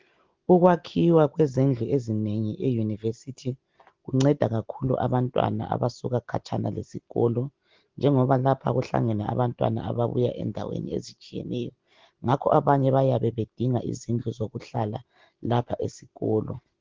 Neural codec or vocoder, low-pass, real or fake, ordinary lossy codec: none; 7.2 kHz; real; Opus, 16 kbps